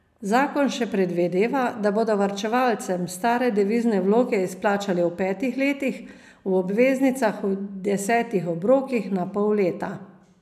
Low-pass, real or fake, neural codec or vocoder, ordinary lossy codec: 14.4 kHz; real; none; none